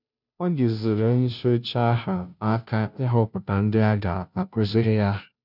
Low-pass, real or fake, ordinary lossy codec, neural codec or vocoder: 5.4 kHz; fake; none; codec, 16 kHz, 0.5 kbps, FunCodec, trained on Chinese and English, 25 frames a second